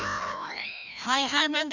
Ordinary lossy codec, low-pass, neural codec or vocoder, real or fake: none; 7.2 kHz; codec, 16 kHz, 1 kbps, FreqCodec, larger model; fake